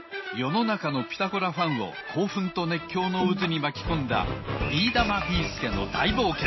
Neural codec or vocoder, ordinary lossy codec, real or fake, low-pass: none; MP3, 24 kbps; real; 7.2 kHz